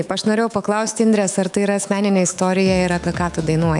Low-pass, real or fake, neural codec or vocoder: 10.8 kHz; fake; autoencoder, 48 kHz, 128 numbers a frame, DAC-VAE, trained on Japanese speech